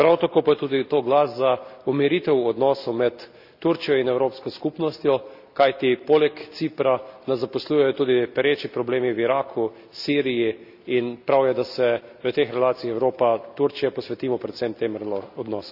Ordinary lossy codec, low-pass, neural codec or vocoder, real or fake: none; 5.4 kHz; none; real